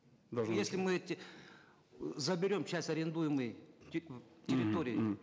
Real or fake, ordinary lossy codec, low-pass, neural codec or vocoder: real; none; none; none